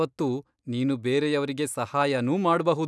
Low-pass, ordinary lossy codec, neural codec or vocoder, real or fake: none; none; none; real